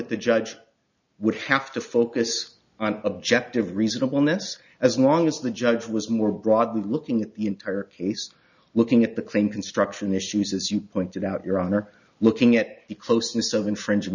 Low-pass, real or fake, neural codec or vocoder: 7.2 kHz; real; none